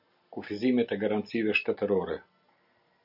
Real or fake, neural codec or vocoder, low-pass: real; none; 5.4 kHz